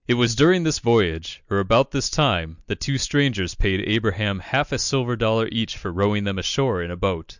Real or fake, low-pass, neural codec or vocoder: real; 7.2 kHz; none